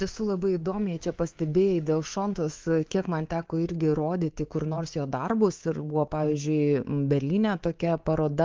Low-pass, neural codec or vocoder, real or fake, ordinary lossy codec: 7.2 kHz; codec, 24 kHz, 3.1 kbps, DualCodec; fake; Opus, 16 kbps